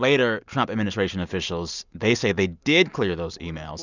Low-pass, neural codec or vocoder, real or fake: 7.2 kHz; none; real